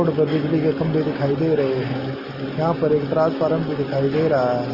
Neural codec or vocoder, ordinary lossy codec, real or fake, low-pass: none; Opus, 24 kbps; real; 5.4 kHz